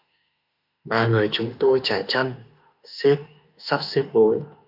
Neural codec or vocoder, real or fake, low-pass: autoencoder, 48 kHz, 32 numbers a frame, DAC-VAE, trained on Japanese speech; fake; 5.4 kHz